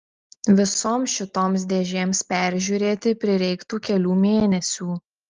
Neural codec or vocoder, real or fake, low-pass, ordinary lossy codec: none; real; 7.2 kHz; Opus, 24 kbps